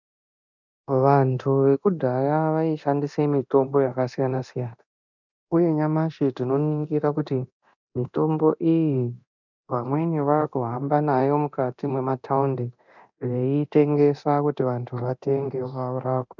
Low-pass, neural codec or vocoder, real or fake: 7.2 kHz; codec, 24 kHz, 0.9 kbps, DualCodec; fake